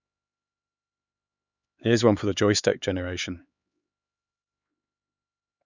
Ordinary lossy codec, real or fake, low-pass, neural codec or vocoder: none; fake; 7.2 kHz; codec, 16 kHz, 4 kbps, X-Codec, HuBERT features, trained on LibriSpeech